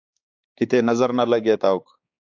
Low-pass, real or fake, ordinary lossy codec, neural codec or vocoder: 7.2 kHz; fake; AAC, 48 kbps; codec, 16 kHz, 4 kbps, X-Codec, HuBERT features, trained on balanced general audio